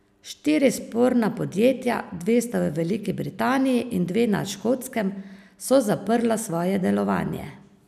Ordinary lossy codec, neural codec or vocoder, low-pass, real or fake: none; none; 14.4 kHz; real